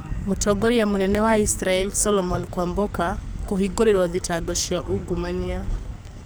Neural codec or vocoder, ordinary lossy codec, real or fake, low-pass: codec, 44.1 kHz, 2.6 kbps, SNAC; none; fake; none